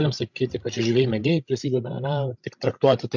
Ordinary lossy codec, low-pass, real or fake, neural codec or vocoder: MP3, 64 kbps; 7.2 kHz; fake; codec, 16 kHz, 16 kbps, FreqCodec, larger model